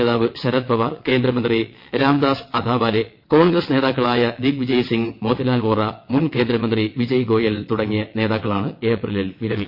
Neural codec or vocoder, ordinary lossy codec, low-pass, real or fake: vocoder, 22.05 kHz, 80 mel bands, WaveNeXt; MP3, 32 kbps; 5.4 kHz; fake